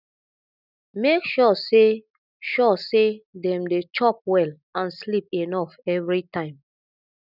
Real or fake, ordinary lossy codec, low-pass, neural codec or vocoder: real; none; 5.4 kHz; none